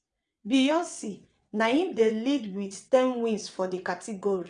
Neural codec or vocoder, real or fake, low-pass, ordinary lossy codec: vocoder, 22.05 kHz, 80 mel bands, WaveNeXt; fake; 9.9 kHz; none